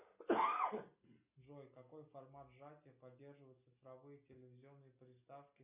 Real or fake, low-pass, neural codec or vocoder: real; 3.6 kHz; none